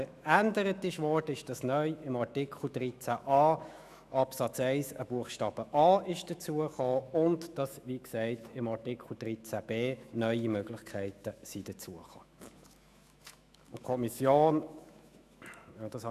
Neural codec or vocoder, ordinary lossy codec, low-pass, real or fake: autoencoder, 48 kHz, 128 numbers a frame, DAC-VAE, trained on Japanese speech; none; 14.4 kHz; fake